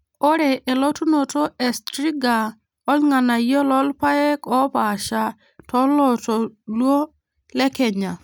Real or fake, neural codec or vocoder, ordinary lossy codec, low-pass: real; none; none; none